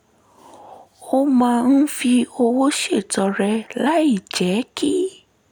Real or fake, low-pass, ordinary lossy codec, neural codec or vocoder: real; 19.8 kHz; none; none